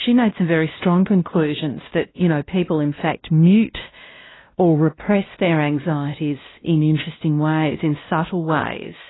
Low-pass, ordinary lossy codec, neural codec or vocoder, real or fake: 7.2 kHz; AAC, 16 kbps; codec, 16 kHz, 1 kbps, X-Codec, WavLM features, trained on Multilingual LibriSpeech; fake